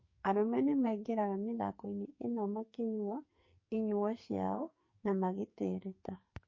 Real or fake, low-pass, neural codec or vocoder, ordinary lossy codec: fake; 7.2 kHz; codec, 44.1 kHz, 2.6 kbps, SNAC; MP3, 32 kbps